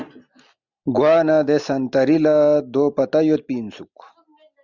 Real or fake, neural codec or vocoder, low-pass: real; none; 7.2 kHz